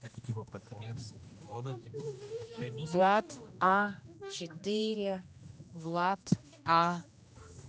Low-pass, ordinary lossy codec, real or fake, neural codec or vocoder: none; none; fake; codec, 16 kHz, 1 kbps, X-Codec, HuBERT features, trained on general audio